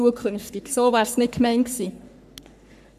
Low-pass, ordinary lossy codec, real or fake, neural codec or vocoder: 14.4 kHz; none; fake; codec, 44.1 kHz, 3.4 kbps, Pupu-Codec